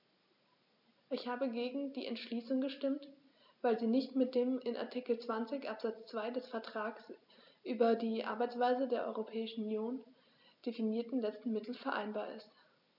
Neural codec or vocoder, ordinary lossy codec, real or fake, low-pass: none; none; real; 5.4 kHz